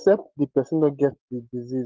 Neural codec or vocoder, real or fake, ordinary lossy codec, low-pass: none; real; Opus, 16 kbps; 7.2 kHz